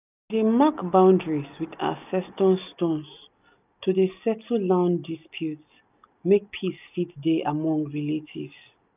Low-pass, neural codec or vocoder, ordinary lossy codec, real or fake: 3.6 kHz; none; none; real